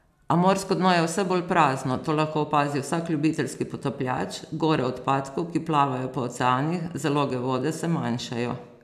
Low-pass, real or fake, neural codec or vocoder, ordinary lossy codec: 14.4 kHz; real; none; none